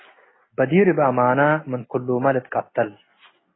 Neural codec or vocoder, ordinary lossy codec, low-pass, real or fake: none; AAC, 16 kbps; 7.2 kHz; real